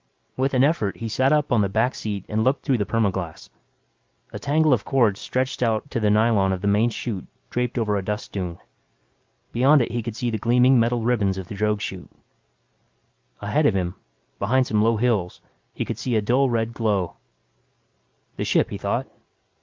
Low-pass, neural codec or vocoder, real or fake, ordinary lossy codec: 7.2 kHz; none; real; Opus, 24 kbps